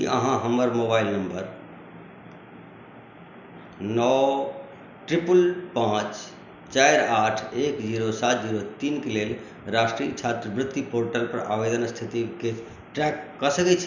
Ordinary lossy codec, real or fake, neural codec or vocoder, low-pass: none; real; none; 7.2 kHz